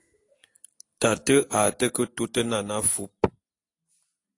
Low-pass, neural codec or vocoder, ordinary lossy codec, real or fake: 10.8 kHz; none; AAC, 48 kbps; real